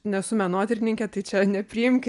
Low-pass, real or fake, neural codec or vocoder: 10.8 kHz; real; none